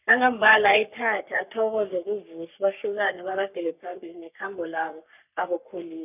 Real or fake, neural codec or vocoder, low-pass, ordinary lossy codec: fake; codec, 44.1 kHz, 3.4 kbps, Pupu-Codec; 3.6 kHz; none